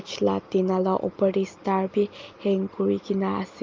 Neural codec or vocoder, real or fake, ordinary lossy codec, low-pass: none; real; Opus, 32 kbps; 7.2 kHz